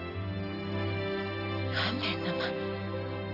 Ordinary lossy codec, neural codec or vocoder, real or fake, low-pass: none; none; real; 5.4 kHz